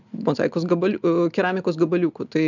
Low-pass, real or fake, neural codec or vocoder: 7.2 kHz; real; none